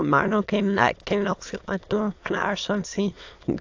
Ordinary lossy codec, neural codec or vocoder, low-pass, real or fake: AAC, 48 kbps; autoencoder, 22.05 kHz, a latent of 192 numbers a frame, VITS, trained on many speakers; 7.2 kHz; fake